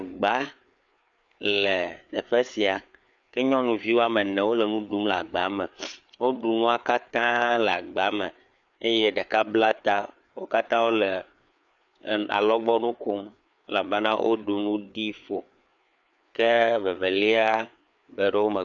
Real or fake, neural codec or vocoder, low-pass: fake; codec, 16 kHz, 4 kbps, FreqCodec, larger model; 7.2 kHz